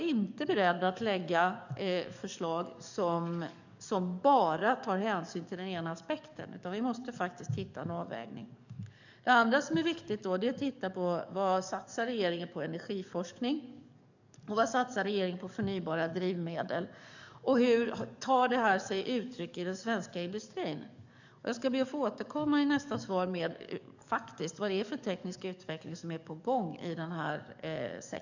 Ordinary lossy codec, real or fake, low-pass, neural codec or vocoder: none; fake; 7.2 kHz; codec, 44.1 kHz, 7.8 kbps, DAC